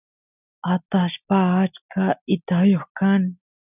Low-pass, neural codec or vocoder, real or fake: 3.6 kHz; none; real